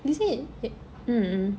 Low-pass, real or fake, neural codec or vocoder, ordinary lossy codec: none; real; none; none